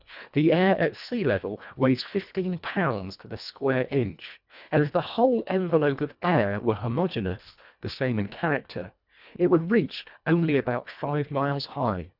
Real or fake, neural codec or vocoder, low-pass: fake; codec, 24 kHz, 1.5 kbps, HILCodec; 5.4 kHz